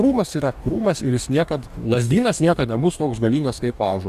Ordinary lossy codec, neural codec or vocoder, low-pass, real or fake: Opus, 64 kbps; codec, 44.1 kHz, 2.6 kbps, DAC; 14.4 kHz; fake